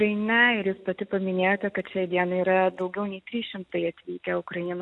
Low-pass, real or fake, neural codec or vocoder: 10.8 kHz; real; none